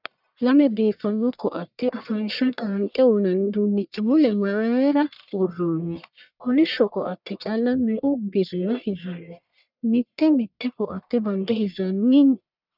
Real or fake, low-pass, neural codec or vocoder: fake; 5.4 kHz; codec, 44.1 kHz, 1.7 kbps, Pupu-Codec